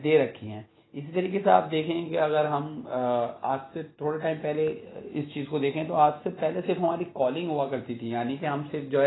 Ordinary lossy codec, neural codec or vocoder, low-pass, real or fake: AAC, 16 kbps; none; 7.2 kHz; real